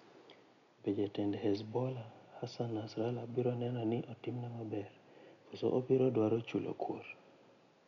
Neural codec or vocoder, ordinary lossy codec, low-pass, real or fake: none; MP3, 96 kbps; 7.2 kHz; real